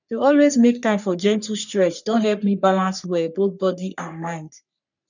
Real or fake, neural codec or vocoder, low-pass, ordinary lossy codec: fake; codec, 44.1 kHz, 3.4 kbps, Pupu-Codec; 7.2 kHz; none